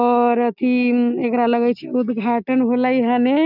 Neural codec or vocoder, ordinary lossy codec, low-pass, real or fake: none; none; 5.4 kHz; real